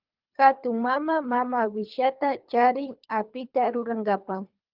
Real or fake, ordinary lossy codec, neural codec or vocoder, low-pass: fake; Opus, 24 kbps; codec, 24 kHz, 3 kbps, HILCodec; 5.4 kHz